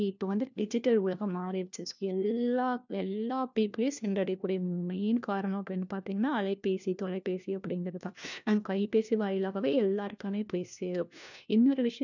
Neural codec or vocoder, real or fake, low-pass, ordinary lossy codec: codec, 16 kHz, 1 kbps, FunCodec, trained on LibriTTS, 50 frames a second; fake; 7.2 kHz; none